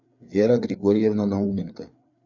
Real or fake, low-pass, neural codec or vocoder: fake; 7.2 kHz; codec, 16 kHz, 4 kbps, FreqCodec, larger model